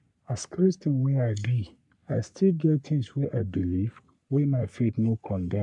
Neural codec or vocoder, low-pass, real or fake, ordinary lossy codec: codec, 44.1 kHz, 3.4 kbps, Pupu-Codec; 10.8 kHz; fake; none